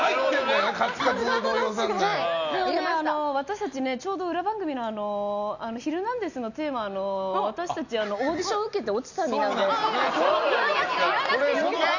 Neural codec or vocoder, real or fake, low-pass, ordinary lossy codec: none; real; 7.2 kHz; none